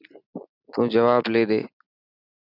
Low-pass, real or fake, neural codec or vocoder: 5.4 kHz; real; none